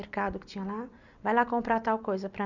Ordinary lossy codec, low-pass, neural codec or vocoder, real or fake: none; 7.2 kHz; none; real